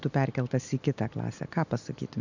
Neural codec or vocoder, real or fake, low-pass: none; real; 7.2 kHz